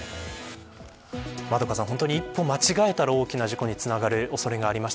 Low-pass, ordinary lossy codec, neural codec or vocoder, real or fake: none; none; none; real